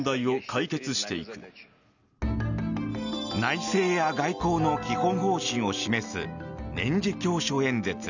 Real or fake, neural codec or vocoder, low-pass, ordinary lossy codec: real; none; 7.2 kHz; none